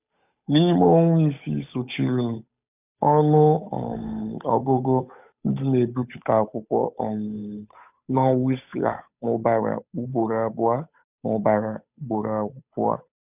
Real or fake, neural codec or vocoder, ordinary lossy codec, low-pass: fake; codec, 16 kHz, 8 kbps, FunCodec, trained on Chinese and English, 25 frames a second; none; 3.6 kHz